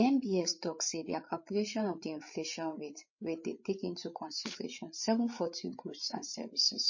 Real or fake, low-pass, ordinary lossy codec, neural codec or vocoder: fake; 7.2 kHz; MP3, 32 kbps; codec, 16 kHz, 8 kbps, FreqCodec, larger model